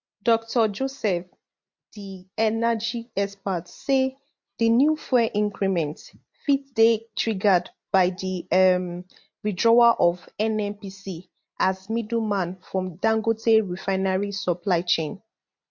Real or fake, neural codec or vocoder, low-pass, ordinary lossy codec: real; none; 7.2 kHz; MP3, 48 kbps